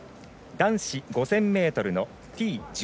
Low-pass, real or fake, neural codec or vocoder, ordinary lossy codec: none; real; none; none